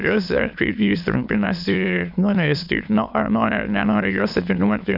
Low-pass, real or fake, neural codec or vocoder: 5.4 kHz; fake; autoencoder, 22.05 kHz, a latent of 192 numbers a frame, VITS, trained on many speakers